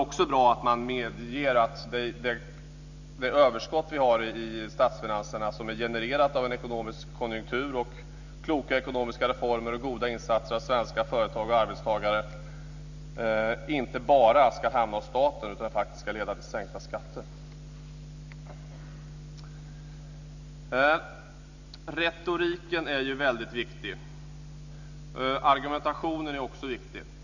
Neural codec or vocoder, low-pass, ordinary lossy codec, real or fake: none; 7.2 kHz; none; real